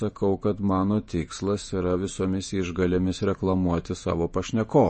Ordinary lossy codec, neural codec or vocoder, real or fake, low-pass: MP3, 32 kbps; vocoder, 48 kHz, 128 mel bands, Vocos; fake; 10.8 kHz